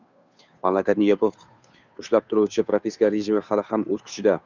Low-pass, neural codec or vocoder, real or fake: 7.2 kHz; codec, 16 kHz, 2 kbps, FunCodec, trained on Chinese and English, 25 frames a second; fake